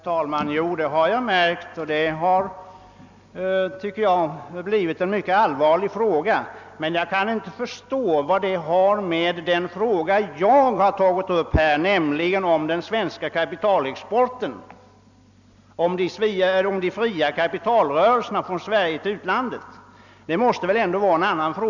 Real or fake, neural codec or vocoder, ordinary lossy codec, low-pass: real; none; none; 7.2 kHz